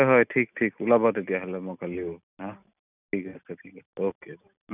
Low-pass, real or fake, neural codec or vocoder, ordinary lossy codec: 3.6 kHz; real; none; none